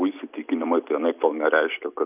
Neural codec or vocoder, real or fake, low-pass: none; real; 3.6 kHz